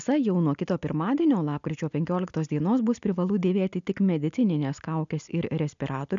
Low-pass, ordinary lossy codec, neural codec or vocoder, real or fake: 7.2 kHz; MP3, 96 kbps; none; real